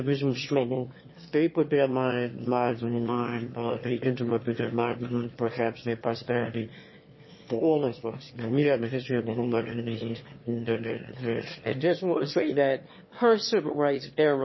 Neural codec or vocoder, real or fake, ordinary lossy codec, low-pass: autoencoder, 22.05 kHz, a latent of 192 numbers a frame, VITS, trained on one speaker; fake; MP3, 24 kbps; 7.2 kHz